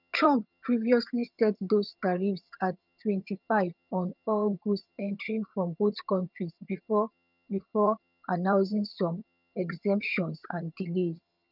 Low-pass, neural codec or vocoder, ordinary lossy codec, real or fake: 5.4 kHz; vocoder, 22.05 kHz, 80 mel bands, HiFi-GAN; none; fake